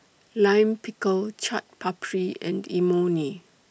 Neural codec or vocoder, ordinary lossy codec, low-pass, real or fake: none; none; none; real